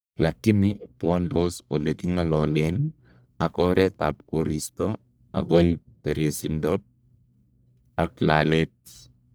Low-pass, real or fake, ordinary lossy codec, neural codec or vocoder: none; fake; none; codec, 44.1 kHz, 1.7 kbps, Pupu-Codec